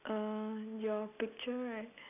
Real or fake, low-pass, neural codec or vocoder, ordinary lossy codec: real; 3.6 kHz; none; none